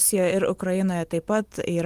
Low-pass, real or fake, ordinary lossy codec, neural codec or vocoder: 14.4 kHz; real; Opus, 24 kbps; none